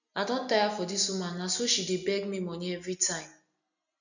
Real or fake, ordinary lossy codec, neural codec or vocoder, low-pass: real; none; none; 7.2 kHz